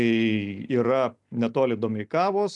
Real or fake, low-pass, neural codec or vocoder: real; 10.8 kHz; none